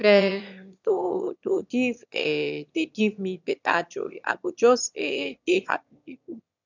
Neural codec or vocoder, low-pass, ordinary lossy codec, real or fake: autoencoder, 22.05 kHz, a latent of 192 numbers a frame, VITS, trained on one speaker; 7.2 kHz; none; fake